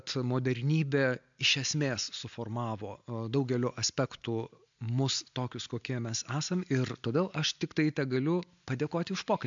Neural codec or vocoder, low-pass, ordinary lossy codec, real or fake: none; 7.2 kHz; MP3, 64 kbps; real